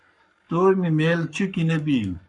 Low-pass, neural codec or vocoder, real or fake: 10.8 kHz; codec, 44.1 kHz, 7.8 kbps, Pupu-Codec; fake